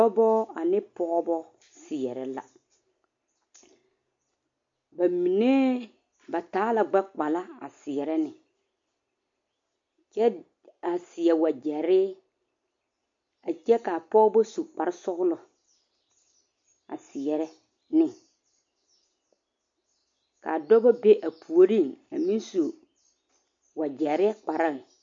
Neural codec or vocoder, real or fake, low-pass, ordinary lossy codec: none; real; 7.2 kHz; MP3, 48 kbps